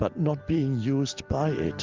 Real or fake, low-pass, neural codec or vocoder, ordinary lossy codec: real; 7.2 kHz; none; Opus, 24 kbps